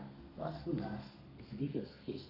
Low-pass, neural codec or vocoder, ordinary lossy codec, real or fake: 5.4 kHz; codec, 32 kHz, 1.9 kbps, SNAC; none; fake